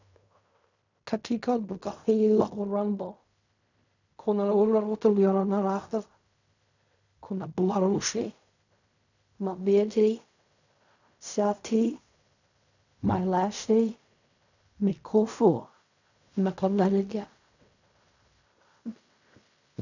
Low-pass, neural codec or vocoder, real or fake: 7.2 kHz; codec, 16 kHz in and 24 kHz out, 0.4 kbps, LongCat-Audio-Codec, fine tuned four codebook decoder; fake